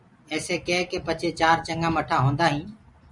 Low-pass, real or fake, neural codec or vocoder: 10.8 kHz; fake; vocoder, 44.1 kHz, 128 mel bands every 256 samples, BigVGAN v2